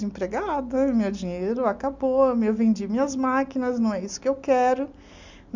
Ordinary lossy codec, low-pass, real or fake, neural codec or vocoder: Opus, 64 kbps; 7.2 kHz; real; none